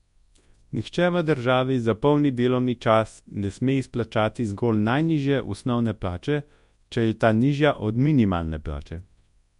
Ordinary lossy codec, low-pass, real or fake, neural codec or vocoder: MP3, 64 kbps; 10.8 kHz; fake; codec, 24 kHz, 0.9 kbps, WavTokenizer, large speech release